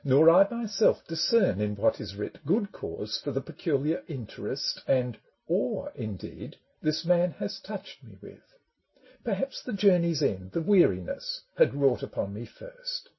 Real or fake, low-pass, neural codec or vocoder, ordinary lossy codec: real; 7.2 kHz; none; MP3, 24 kbps